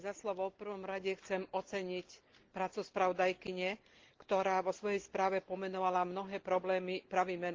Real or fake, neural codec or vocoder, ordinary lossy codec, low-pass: real; none; Opus, 16 kbps; 7.2 kHz